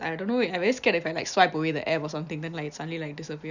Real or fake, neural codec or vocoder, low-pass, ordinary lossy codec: real; none; 7.2 kHz; none